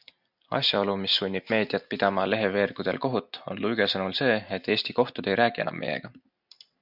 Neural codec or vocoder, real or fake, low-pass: none; real; 5.4 kHz